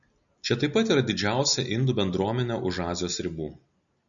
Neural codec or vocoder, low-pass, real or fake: none; 7.2 kHz; real